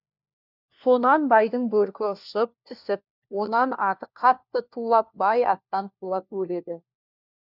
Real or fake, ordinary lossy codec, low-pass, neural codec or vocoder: fake; none; 5.4 kHz; codec, 16 kHz, 1 kbps, FunCodec, trained on LibriTTS, 50 frames a second